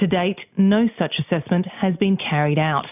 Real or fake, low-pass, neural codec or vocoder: real; 3.6 kHz; none